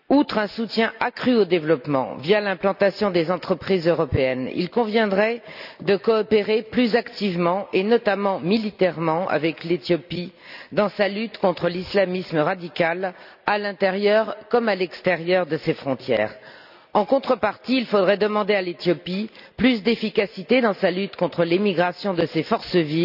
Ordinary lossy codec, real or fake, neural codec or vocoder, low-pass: none; real; none; 5.4 kHz